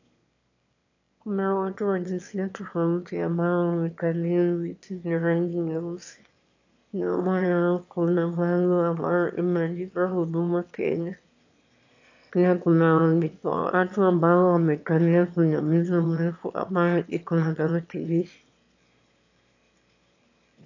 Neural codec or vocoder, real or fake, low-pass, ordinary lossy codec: autoencoder, 22.05 kHz, a latent of 192 numbers a frame, VITS, trained on one speaker; fake; 7.2 kHz; AAC, 48 kbps